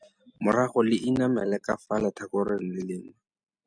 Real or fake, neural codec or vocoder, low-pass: real; none; 9.9 kHz